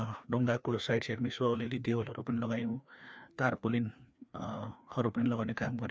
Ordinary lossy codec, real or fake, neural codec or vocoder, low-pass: none; fake; codec, 16 kHz, 2 kbps, FreqCodec, larger model; none